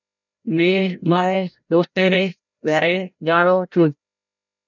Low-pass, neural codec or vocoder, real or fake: 7.2 kHz; codec, 16 kHz, 0.5 kbps, FreqCodec, larger model; fake